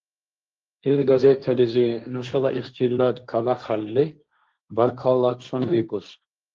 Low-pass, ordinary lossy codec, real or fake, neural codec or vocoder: 7.2 kHz; Opus, 32 kbps; fake; codec, 16 kHz, 1.1 kbps, Voila-Tokenizer